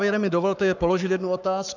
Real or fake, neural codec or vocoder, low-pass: fake; codec, 44.1 kHz, 7.8 kbps, Pupu-Codec; 7.2 kHz